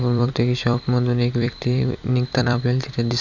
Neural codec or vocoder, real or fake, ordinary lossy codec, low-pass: vocoder, 44.1 kHz, 128 mel bands every 256 samples, BigVGAN v2; fake; none; 7.2 kHz